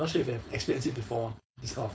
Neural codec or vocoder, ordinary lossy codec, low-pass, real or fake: codec, 16 kHz, 4.8 kbps, FACodec; none; none; fake